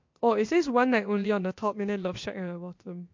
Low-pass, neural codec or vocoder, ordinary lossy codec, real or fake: 7.2 kHz; codec, 16 kHz, about 1 kbps, DyCAST, with the encoder's durations; MP3, 48 kbps; fake